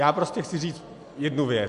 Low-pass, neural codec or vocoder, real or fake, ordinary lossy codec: 10.8 kHz; none; real; AAC, 64 kbps